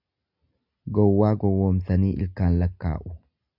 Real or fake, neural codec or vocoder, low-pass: real; none; 5.4 kHz